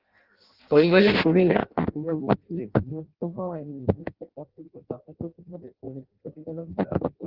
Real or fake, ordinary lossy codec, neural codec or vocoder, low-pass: fake; Opus, 16 kbps; codec, 16 kHz in and 24 kHz out, 0.6 kbps, FireRedTTS-2 codec; 5.4 kHz